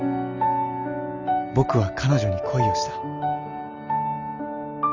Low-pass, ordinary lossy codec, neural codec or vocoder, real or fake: 7.2 kHz; Opus, 32 kbps; none; real